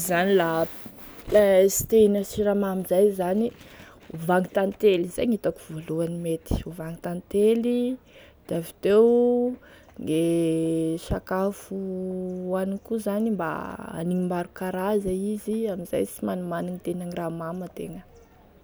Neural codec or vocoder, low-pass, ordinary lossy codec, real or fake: none; none; none; real